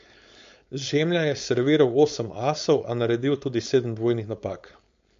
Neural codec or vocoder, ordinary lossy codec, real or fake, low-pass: codec, 16 kHz, 4.8 kbps, FACodec; MP3, 48 kbps; fake; 7.2 kHz